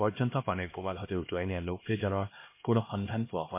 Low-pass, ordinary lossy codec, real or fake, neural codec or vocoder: 3.6 kHz; MP3, 24 kbps; fake; codec, 16 kHz, 1 kbps, X-Codec, HuBERT features, trained on LibriSpeech